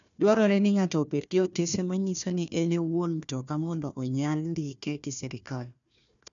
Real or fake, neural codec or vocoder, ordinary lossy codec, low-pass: fake; codec, 16 kHz, 1 kbps, FunCodec, trained on Chinese and English, 50 frames a second; none; 7.2 kHz